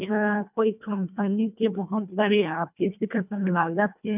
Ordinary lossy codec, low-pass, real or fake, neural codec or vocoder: none; 3.6 kHz; fake; codec, 24 kHz, 1.5 kbps, HILCodec